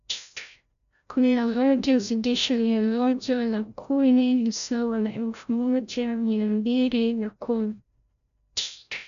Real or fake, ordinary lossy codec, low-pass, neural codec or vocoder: fake; none; 7.2 kHz; codec, 16 kHz, 0.5 kbps, FreqCodec, larger model